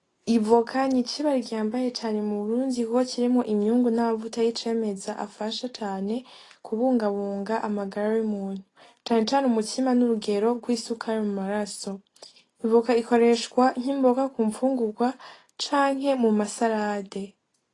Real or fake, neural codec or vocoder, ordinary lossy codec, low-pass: real; none; AAC, 32 kbps; 10.8 kHz